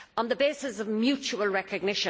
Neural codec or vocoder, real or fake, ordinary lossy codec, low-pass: none; real; none; none